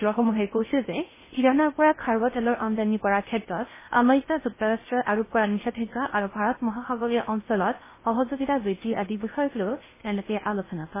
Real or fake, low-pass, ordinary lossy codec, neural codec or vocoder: fake; 3.6 kHz; MP3, 16 kbps; codec, 16 kHz in and 24 kHz out, 0.6 kbps, FocalCodec, streaming, 4096 codes